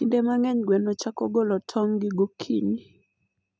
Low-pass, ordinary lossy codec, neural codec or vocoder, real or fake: none; none; none; real